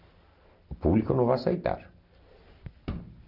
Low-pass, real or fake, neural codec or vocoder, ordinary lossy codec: 5.4 kHz; real; none; none